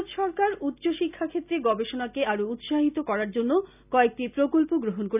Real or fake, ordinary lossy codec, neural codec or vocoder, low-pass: real; none; none; 3.6 kHz